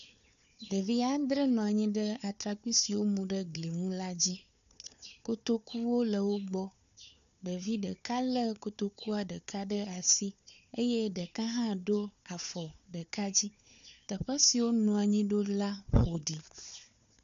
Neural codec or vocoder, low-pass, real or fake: codec, 16 kHz, 4 kbps, FunCodec, trained on Chinese and English, 50 frames a second; 7.2 kHz; fake